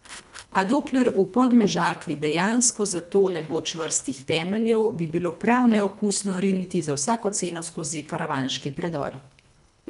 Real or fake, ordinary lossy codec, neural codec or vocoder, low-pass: fake; none; codec, 24 kHz, 1.5 kbps, HILCodec; 10.8 kHz